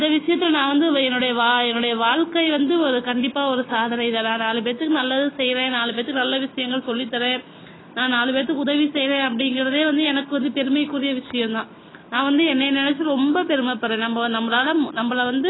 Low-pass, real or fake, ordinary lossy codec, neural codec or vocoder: 7.2 kHz; real; AAC, 16 kbps; none